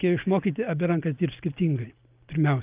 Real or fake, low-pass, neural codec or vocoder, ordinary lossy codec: real; 3.6 kHz; none; Opus, 24 kbps